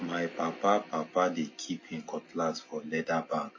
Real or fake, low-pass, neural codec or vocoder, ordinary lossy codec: real; 7.2 kHz; none; MP3, 32 kbps